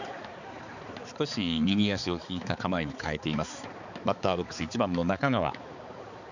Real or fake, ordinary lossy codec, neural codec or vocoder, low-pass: fake; none; codec, 16 kHz, 4 kbps, X-Codec, HuBERT features, trained on balanced general audio; 7.2 kHz